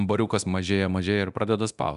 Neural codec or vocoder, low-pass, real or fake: codec, 24 kHz, 0.9 kbps, DualCodec; 10.8 kHz; fake